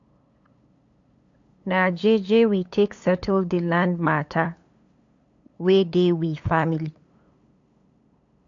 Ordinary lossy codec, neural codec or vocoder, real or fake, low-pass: AAC, 48 kbps; codec, 16 kHz, 8 kbps, FunCodec, trained on LibriTTS, 25 frames a second; fake; 7.2 kHz